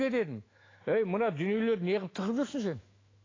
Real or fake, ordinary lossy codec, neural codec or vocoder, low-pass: fake; AAC, 32 kbps; autoencoder, 48 kHz, 128 numbers a frame, DAC-VAE, trained on Japanese speech; 7.2 kHz